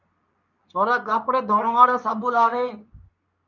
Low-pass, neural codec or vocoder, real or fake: 7.2 kHz; codec, 24 kHz, 0.9 kbps, WavTokenizer, medium speech release version 2; fake